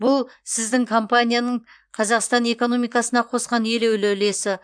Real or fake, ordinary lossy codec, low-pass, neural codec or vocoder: fake; MP3, 96 kbps; 9.9 kHz; vocoder, 44.1 kHz, 128 mel bands, Pupu-Vocoder